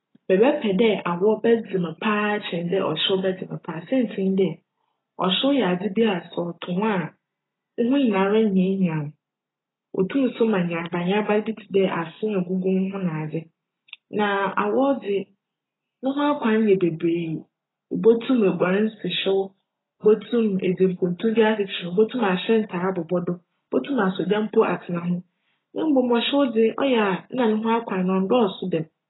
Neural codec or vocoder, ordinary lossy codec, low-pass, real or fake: none; AAC, 16 kbps; 7.2 kHz; real